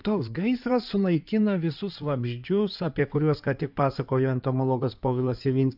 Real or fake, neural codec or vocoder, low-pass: fake; codec, 16 kHz in and 24 kHz out, 2.2 kbps, FireRedTTS-2 codec; 5.4 kHz